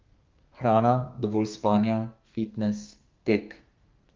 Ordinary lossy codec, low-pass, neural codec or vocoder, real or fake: Opus, 24 kbps; 7.2 kHz; codec, 32 kHz, 1.9 kbps, SNAC; fake